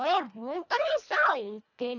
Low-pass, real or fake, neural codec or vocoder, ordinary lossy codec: 7.2 kHz; fake; codec, 24 kHz, 1.5 kbps, HILCodec; none